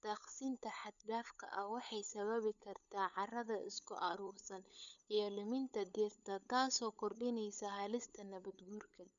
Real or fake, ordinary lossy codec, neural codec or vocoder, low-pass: fake; none; codec, 16 kHz, 8 kbps, FunCodec, trained on LibriTTS, 25 frames a second; 7.2 kHz